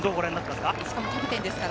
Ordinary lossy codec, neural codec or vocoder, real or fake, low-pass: none; none; real; none